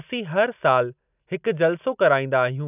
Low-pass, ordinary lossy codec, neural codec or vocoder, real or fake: 3.6 kHz; none; none; real